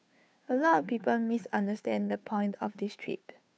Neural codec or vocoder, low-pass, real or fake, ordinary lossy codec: codec, 16 kHz, 2 kbps, FunCodec, trained on Chinese and English, 25 frames a second; none; fake; none